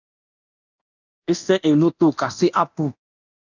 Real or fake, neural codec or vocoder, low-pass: fake; codec, 24 kHz, 0.9 kbps, DualCodec; 7.2 kHz